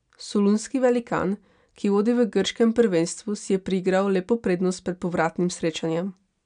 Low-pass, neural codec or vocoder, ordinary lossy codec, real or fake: 9.9 kHz; none; none; real